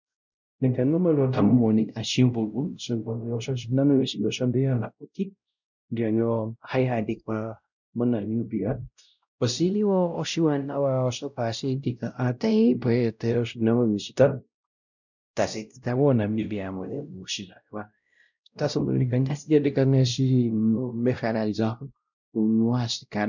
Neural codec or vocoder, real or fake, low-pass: codec, 16 kHz, 0.5 kbps, X-Codec, WavLM features, trained on Multilingual LibriSpeech; fake; 7.2 kHz